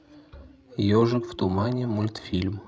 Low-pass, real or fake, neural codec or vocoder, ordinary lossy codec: none; fake; codec, 16 kHz, 16 kbps, FreqCodec, larger model; none